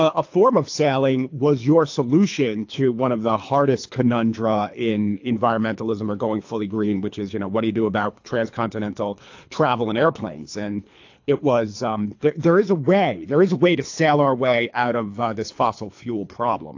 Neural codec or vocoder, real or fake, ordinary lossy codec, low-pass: codec, 24 kHz, 3 kbps, HILCodec; fake; AAC, 48 kbps; 7.2 kHz